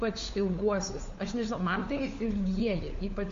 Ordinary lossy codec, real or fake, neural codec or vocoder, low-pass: MP3, 48 kbps; fake; codec, 16 kHz, 4 kbps, FunCodec, trained on LibriTTS, 50 frames a second; 7.2 kHz